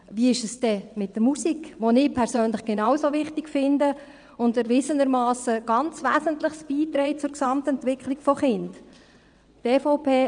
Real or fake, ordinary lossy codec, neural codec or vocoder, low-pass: fake; none; vocoder, 22.05 kHz, 80 mel bands, WaveNeXt; 9.9 kHz